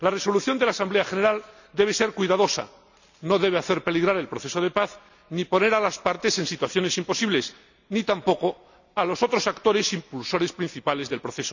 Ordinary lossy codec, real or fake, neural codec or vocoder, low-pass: none; real; none; 7.2 kHz